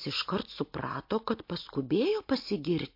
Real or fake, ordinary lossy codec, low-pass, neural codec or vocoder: real; MP3, 32 kbps; 5.4 kHz; none